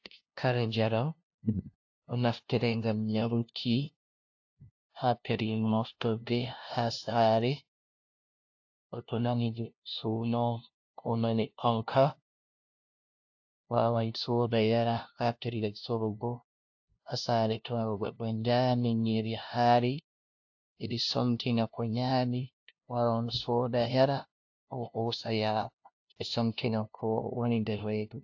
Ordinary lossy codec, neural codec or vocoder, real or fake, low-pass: AAC, 48 kbps; codec, 16 kHz, 0.5 kbps, FunCodec, trained on LibriTTS, 25 frames a second; fake; 7.2 kHz